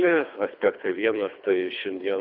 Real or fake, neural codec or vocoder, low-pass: fake; codec, 24 kHz, 3 kbps, HILCodec; 5.4 kHz